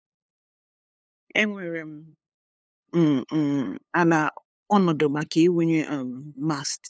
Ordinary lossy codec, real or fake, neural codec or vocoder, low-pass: none; fake; codec, 16 kHz, 8 kbps, FunCodec, trained on LibriTTS, 25 frames a second; none